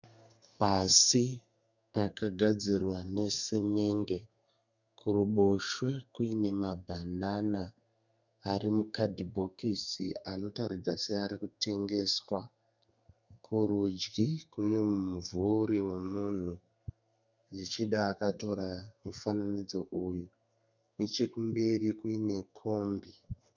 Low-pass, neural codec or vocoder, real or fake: 7.2 kHz; codec, 32 kHz, 1.9 kbps, SNAC; fake